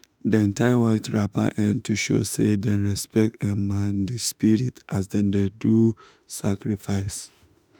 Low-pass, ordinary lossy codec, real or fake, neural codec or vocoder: none; none; fake; autoencoder, 48 kHz, 32 numbers a frame, DAC-VAE, trained on Japanese speech